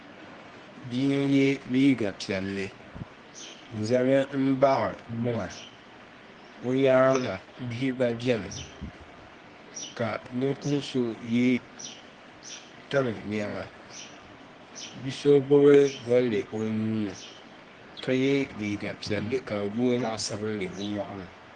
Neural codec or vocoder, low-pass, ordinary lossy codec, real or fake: codec, 24 kHz, 0.9 kbps, WavTokenizer, medium music audio release; 10.8 kHz; Opus, 24 kbps; fake